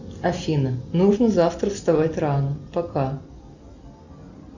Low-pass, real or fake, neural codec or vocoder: 7.2 kHz; real; none